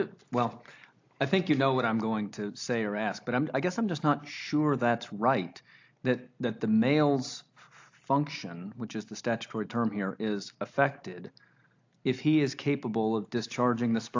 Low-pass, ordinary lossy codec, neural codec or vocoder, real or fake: 7.2 kHz; AAC, 48 kbps; none; real